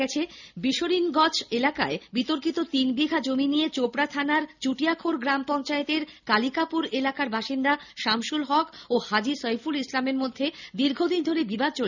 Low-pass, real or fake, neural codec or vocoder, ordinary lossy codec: 7.2 kHz; real; none; none